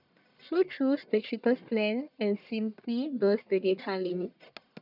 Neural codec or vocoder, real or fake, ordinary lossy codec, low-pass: codec, 44.1 kHz, 1.7 kbps, Pupu-Codec; fake; none; 5.4 kHz